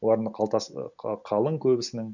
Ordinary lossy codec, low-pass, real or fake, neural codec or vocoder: none; 7.2 kHz; real; none